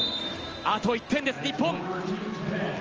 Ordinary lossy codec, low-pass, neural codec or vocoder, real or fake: Opus, 24 kbps; 7.2 kHz; none; real